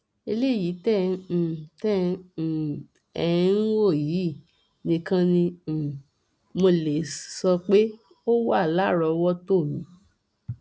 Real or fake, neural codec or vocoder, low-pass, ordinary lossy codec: real; none; none; none